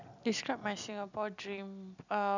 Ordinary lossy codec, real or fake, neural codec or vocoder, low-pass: none; real; none; 7.2 kHz